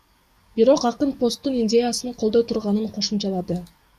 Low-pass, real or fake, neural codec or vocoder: 14.4 kHz; fake; codec, 44.1 kHz, 7.8 kbps, DAC